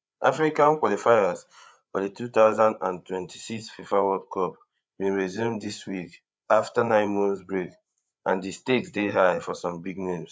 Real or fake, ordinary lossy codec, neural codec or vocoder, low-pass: fake; none; codec, 16 kHz, 8 kbps, FreqCodec, larger model; none